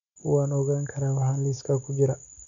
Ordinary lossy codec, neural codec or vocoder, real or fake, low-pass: none; none; real; 7.2 kHz